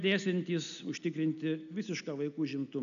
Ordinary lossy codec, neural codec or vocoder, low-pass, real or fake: MP3, 96 kbps; none; 7.2 kHz; real